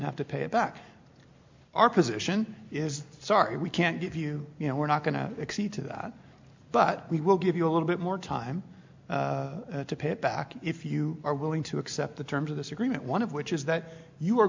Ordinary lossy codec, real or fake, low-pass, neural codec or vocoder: MP3, 64 kbps; real; 7.2 kHz; none